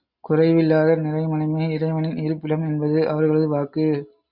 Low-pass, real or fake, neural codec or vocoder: 5.4 kHz; real; none